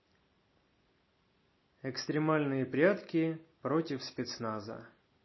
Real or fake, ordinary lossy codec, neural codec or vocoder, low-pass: real; MP3, 24 kbps; none; 7.2 kHz